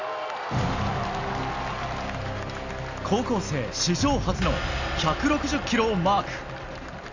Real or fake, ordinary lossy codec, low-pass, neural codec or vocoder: real; Opus, 64 kbps; 7.2 kHz; none